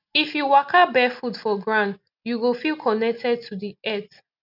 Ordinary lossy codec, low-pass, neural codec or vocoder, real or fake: none; 5.4 kHz; none; real